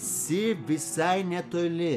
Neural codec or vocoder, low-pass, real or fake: none; 14.4 kHz; real